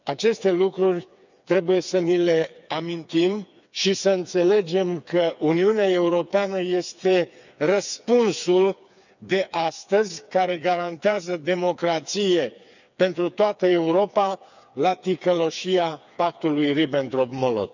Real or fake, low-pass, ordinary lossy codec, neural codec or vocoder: fake; 7.2 kHz; none; codec, 16 kHz, 4 kbps, FreqCodec, smaller model